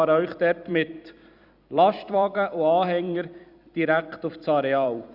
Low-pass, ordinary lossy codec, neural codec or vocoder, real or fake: 5.4 kHz; Opus, 64 kbps; vocoder, 44.1 kHz, 128 mel bands every 256 samples, BigVGAN v2; fake